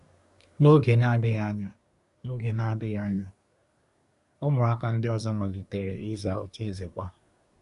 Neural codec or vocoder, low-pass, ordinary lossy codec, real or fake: codec, 24 kHz, 1 kbps, SNAC; 10.8 kHz; none; fake